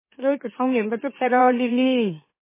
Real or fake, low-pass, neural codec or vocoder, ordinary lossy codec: fake; 3.6 kHz; autoencoder, 44.1 kHz, a latent of 192 numbers a frame, MeloTTS; MP3, 16 kbps